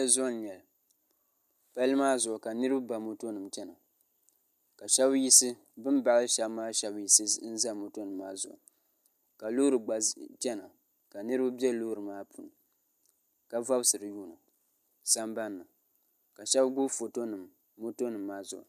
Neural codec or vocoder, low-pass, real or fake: none; 14.4 kHz; real